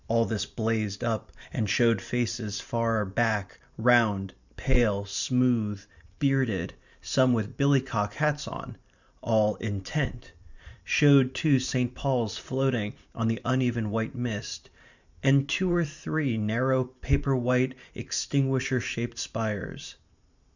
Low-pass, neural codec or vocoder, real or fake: 7.2 kHz; vocoder, 44.1 kHz, 128 mel bands every 512 samples, BigVGAN v2; fake